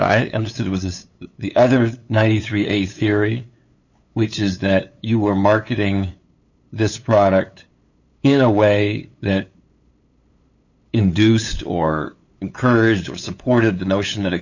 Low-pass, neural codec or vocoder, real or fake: 7.2 kHz; codec, 16 kHz, 8 kbps, FunCodec, trained on LibriTTS, 25 frames a second; fake